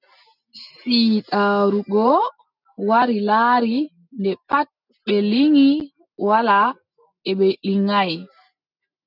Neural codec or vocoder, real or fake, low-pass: none; real; 5.4 kHz